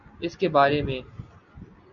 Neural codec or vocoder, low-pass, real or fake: none; 7.2 kHz; real